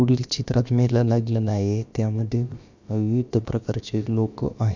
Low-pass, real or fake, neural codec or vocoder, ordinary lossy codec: 7.2 kHz; fake; codec, 16 kHz, about 1 kbps, DyCAST, with the encoder's durations; none